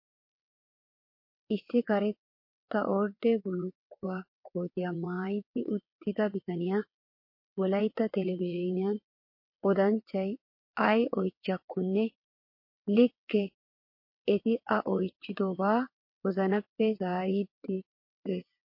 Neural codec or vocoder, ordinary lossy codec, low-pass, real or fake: vocoder, 22.05 kHz, 80 mel bands, WaveNeXt; MP3, 32 kbps; 5.4 kHz; fake